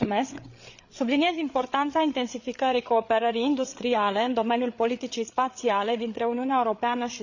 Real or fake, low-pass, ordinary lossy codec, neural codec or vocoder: fake; 7.2 kHz; none; codec, 16 kHz, 8 kbps, FreqCodec, larger model